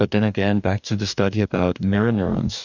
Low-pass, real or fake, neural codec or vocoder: 7.2 kHz; fake; codec, 44.1 kHz, 2.6 kbps, DAC